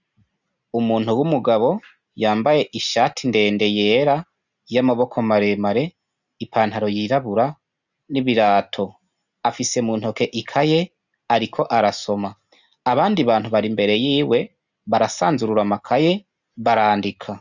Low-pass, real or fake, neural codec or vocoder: 7.2 kHz; real; none